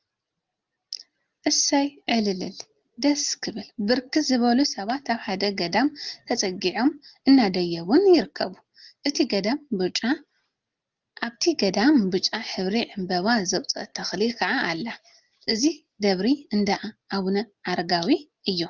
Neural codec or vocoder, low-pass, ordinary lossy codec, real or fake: none; 7.2 kHz; Opus, 24 kbps; real